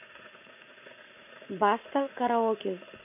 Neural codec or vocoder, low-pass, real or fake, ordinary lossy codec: vocoder, 44.1 kHz, 80 mel bands, Vocos; 3.6 kHz; fake; none